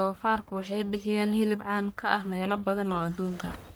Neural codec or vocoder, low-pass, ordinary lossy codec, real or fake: codec, 44.1 kHz, 1.7 kbps, Pupu-Codec; none; none; fake